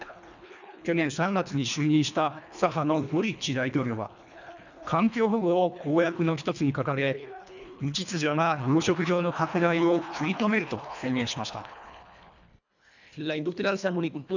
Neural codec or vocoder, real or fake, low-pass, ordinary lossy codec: codec, 24 kHz, 1.5 kbps, HILCodec; fake; 7.2 kHz; none